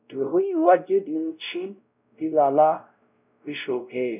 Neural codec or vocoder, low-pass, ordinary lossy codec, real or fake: codec, 16 kHz, 0.5 kbps, X-Codec, WavLM features, trained on Multilingual LibriSpeech; 3.6 kHz; none; fake